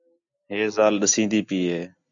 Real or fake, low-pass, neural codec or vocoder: real; 7.2 kHz; none